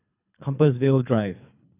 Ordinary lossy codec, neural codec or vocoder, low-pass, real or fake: none; codec, 24 kHz, 3 kbps, HILCodec; 3.6 kHz; fake